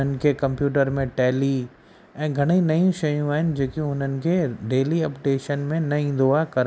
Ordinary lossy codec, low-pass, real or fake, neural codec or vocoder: none; none; real; none